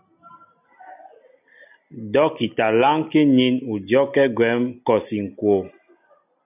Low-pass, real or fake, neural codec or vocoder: 3.6 kHz; real; none